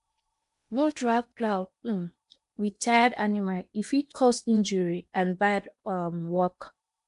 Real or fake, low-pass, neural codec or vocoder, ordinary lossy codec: fake; 10.8 kHz; codec, 16 kHz in and 24 kHz out, 0.8 kbps, FocalCodec, streaming, 65536 codes; MP3, 64 kbps